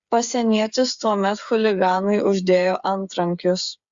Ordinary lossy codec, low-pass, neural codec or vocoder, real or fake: Opus, 64 kbps; 7.2 kHz; codec, 16 kHz, 8 kbps, FreqCodec, smaller model; fake